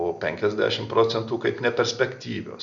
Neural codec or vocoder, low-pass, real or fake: none; 7.2 kHz; real